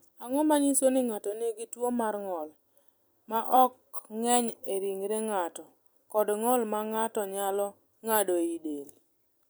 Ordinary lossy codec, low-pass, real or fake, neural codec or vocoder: none; none; fake; vocoder, 44.1 kHz, 128 mel bands every 256 samples, BigVGAN v2